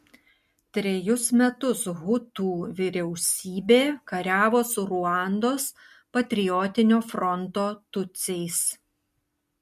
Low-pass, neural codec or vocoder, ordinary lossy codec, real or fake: 14.4 kHz; none; MP3, 64 kbps; real